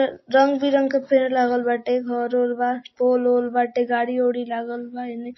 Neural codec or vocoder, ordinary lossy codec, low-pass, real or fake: none; MP3, 24 kbps; 7.2 kHz; real